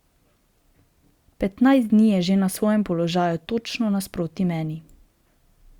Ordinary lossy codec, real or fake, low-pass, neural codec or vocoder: Opus, 64 kbps; real; 19.8 kHz; none